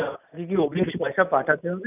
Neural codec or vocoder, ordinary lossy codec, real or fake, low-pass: none; none; real; 3.6 kHz